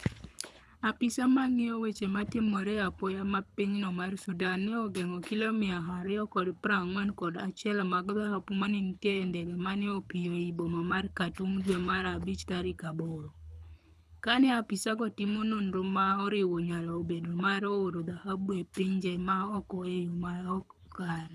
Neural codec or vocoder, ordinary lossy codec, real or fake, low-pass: codec, 24 kHz, 6 kbps, HILCodec; none; fake; none